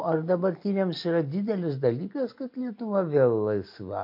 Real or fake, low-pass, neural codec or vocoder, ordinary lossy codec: real; 5.4 kHz; none; MP3, 32 kbps